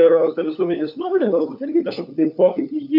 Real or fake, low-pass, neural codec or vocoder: fake; 5.4 kHz; codec, 16 kHz, 4 kbps, FunCodec, trained on LibriTTS, 50 frames a second